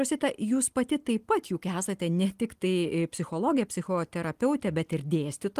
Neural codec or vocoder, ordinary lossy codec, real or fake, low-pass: none; Opus, 24 kbps; real; 14.4 kHz